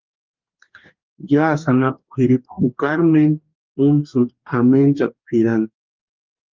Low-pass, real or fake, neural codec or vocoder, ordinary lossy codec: 7.2 kHz; fake; codec, 44.1 kHz, 2.6 kbps, DAC; Opus, 32 kbps